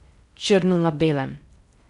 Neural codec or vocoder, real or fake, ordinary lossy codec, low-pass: codec, 16 kHz in and 24 kHz out, 0.6 kbps, FocalCodec, streaming, 4096 codes; fake; none; 10.8 kHz